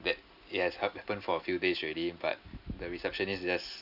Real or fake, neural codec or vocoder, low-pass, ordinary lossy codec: real; none; 5.4 kHz; none